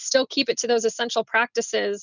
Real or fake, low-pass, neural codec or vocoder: real; 7.2 kHz; none